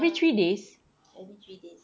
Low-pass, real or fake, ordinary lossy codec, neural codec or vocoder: none; real; none; none